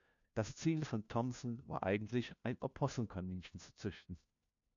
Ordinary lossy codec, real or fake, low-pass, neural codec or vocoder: AAC, 64 kbps; fake; 7.2 kHz; codec, 16 kHz, 1 kbps, FunCodec, trained on LibriTTS, 50 frames a second